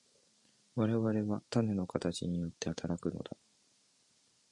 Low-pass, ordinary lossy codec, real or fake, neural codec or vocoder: 10.8 kHz; MP3, 48 kbps; fake; vocoder, 44.1 kHz, 128 mel bands every 512 samples, BigVGAN v2